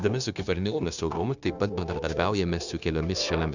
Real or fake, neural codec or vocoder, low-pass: fake; codec, 16 kHz, 0.9 kbps, LongCat-Audio-Codec; 7.2 kHz